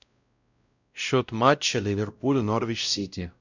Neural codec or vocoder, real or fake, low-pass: codec, 16 kHz, 0.5 kbps, X-Codec, WavLM features, trained on Multilingual LibriSpeech; fake; 7.2 kHz